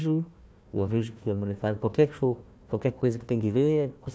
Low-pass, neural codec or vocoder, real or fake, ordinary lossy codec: none; codec, 16 kHz, 1 kbps, FunCodec, trained on Chinese and English, 50 frames a second; fake; none